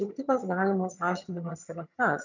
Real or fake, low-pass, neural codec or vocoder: fake; 7.2 kHz; vocoder, 22.05 kHz, 80 mel bands, HiFi-GAN